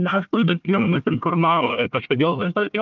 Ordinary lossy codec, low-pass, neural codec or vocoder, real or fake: Opus, 32 kbps; 7.2 kHz; codec, 16 kHz, 1 kbps, FunCodec, trained on Chinese and English, 50 frames a second; fake